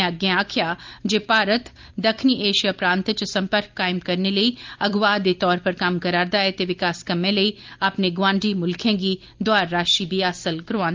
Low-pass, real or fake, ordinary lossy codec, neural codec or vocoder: 7.2 kHz; real; Opus, 24 kbps; none